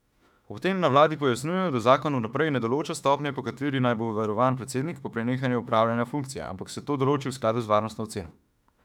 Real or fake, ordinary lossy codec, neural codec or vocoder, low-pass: fake; none; autoencoder, 48 kHz, 32 numbers a frame, DAC-VAE, trained on Japanese speech; 19.8 kHz